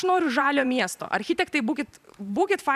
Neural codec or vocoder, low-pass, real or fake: vocoder, 44.1 kHz, 128 mel bands every 512 samples, BigVGAN v2; 14.4 kHz; fake